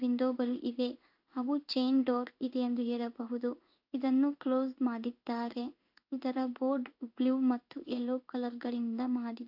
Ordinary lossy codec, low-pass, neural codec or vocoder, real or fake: AAC, 48 kbps; 5.4 kHz; codec, 16 kHz in and 24 kHz out, 1 kbps, XY-Tokenizer; fake